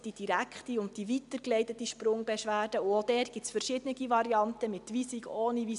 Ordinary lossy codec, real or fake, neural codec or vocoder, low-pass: none; real; none; 10.8 kHz